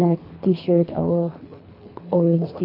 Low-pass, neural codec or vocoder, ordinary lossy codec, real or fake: 5.4 kHz; codec, 24 kHz, 3 kbps, HILCodec; AAC, 48 kbps; fake